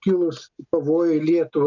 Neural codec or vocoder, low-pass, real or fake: none; 7.2 kHz; real